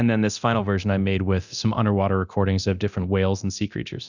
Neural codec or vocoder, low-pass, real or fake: codec, 24 kHz, 0.9 kbps, DualCodec; 7.2 kHz; fake